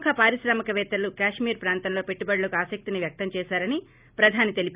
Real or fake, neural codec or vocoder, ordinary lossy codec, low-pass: real; none; Opus, 64 kbps; 3.6 kHz